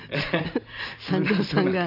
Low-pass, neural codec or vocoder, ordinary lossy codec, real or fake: 5.4 kHz; none; none; real